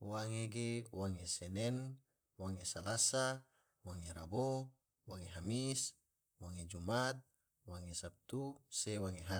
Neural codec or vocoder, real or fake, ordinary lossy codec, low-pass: vocoder, 44.1 kHz, 128 mel bands, Pupu-Vocoder; fake; none; none